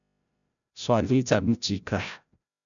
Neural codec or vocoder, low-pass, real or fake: codec, 16 kHz, 0.5 kbps, FreqCodec, larger model; 7.2 kHz; fake